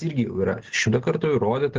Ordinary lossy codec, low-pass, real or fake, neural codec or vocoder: Opus, 16 kbps; 7.2 kHz; real; none